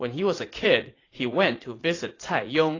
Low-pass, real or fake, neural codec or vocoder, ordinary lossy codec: 7.2 kHz; fake; vocoder, 44.1 kHz, 80 mel bands, Vocos; AAC, 32 kbps